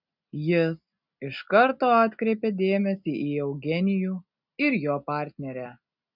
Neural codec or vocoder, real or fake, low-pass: none; real; 5.4 kHz